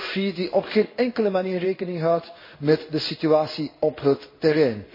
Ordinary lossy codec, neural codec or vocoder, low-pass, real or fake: MP3, 24 kbps; codec, 16 kHz in and 24 kHz out, 1 kbps, XY-Tokenizer; 5.4 kHz; fake